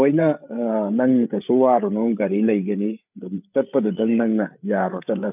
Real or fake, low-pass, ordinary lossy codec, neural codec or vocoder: fake; 3.6 kHz; none; codec, 16 kHz, 8 kbps, FreqCodec, larger model